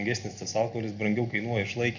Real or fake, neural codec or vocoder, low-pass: real; none; 7.2 kHz